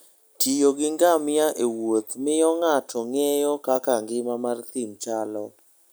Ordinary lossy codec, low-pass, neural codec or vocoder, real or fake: none; none; none; real